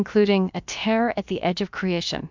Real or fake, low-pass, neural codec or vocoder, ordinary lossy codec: fake; 7.2 kHz; codec, 16 kHz, about 1 kbps, DyCAST, with the encoder's durations; MP3, 64 kbps